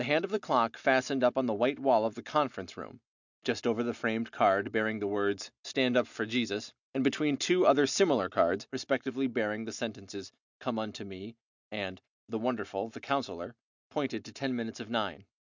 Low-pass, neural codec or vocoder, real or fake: 7.2 kHz; none; real